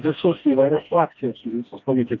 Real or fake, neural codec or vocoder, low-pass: fake; codec, 16 kHz, 1 kbps, FreqCodec, smaller model; 7.2 kHz